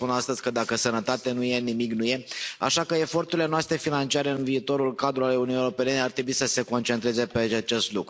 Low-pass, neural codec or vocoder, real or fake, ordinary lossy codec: none; none; real; none